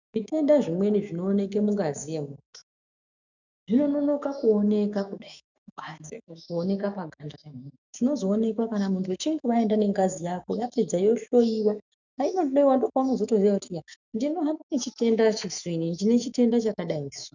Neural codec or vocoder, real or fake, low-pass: autoencoder, 48 kHz, 128 numbers a frame, DAC-VAE, trained on Japanese speech; fake; 7.2 kHz